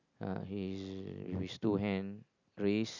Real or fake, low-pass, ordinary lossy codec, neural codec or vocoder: real; 7.2 kHz; none; none